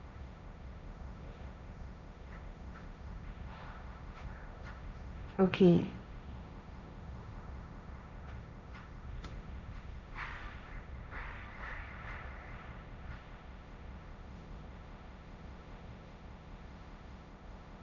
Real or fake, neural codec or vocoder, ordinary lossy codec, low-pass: fake; codec, 16 kHz, 1.1 kbps, Voila-Tokenizer; none; 7.2 kHz